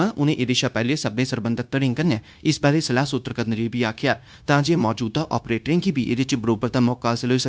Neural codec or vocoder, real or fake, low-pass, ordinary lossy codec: codec, 16 kHz, 0.9 kbps, LongCat-Audio-Codec; fake; none; none